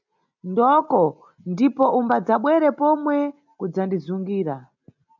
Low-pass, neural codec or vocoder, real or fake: 7.2 kHz; none; real